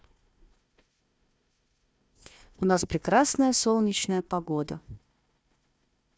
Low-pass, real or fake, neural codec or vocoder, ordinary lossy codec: none; fake; codec, 16 kHz, 1 kbps, FunCodec, trained on Chinese and English, 50 frames a second; none